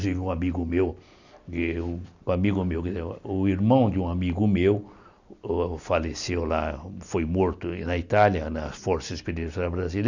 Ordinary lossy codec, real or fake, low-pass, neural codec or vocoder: none; real; 7.2 kHz; none